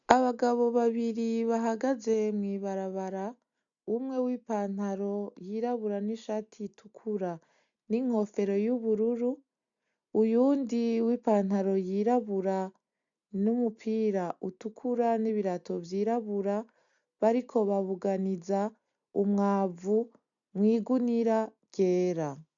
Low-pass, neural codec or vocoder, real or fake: 7.2 kHz; none; real